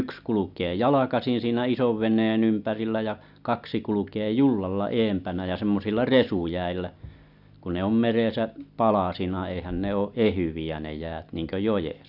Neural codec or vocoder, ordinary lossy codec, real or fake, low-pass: none; none; real; 5.4 kHz